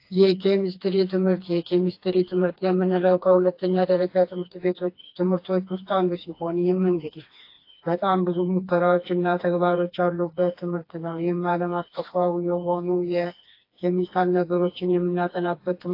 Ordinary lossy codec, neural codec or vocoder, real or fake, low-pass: AAC, 32 kbps; codec, 16 kHz, 2 kbps, FreqCodec, smaller model; fake; 5.4 kHz